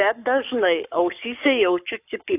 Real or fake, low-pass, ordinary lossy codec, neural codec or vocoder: fake; 3.6 kHz; AAC, 32 kbps; codec, 44.1 kHz, 7.8 kbps, Pupu-Codec